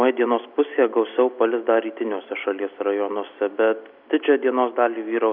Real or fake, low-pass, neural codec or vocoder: real; 5.4 kHz; none